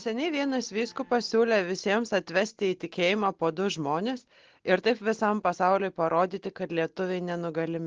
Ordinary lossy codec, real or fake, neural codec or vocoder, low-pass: Opus, 16 kbps; real; none; 7.2 kHz